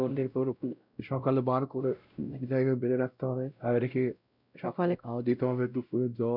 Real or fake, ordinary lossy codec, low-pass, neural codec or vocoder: fake; none; 5.4 kHz; codec, 16 kHz, 0.5 kbps, X-Codec, WavLM features, trained on Multilingual LibriSpeech